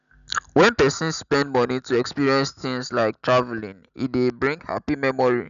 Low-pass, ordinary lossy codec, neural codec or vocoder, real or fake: 7.2 kHz; none; none; real